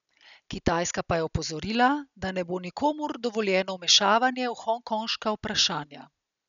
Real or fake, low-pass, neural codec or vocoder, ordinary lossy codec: real; 7.2 kHz; none; none